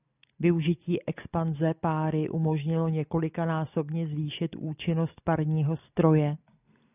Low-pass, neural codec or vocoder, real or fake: 3.6 kHz; none; real